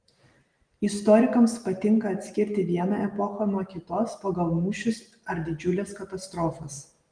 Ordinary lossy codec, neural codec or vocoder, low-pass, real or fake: Opus, 24 kbps; none; 9.9 kHz; real